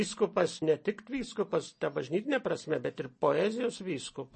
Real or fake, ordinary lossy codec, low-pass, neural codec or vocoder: real; MP3, 32 kbps; 10.8 kHz; none